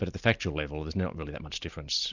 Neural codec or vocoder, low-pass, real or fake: none; 7.2 kHz; real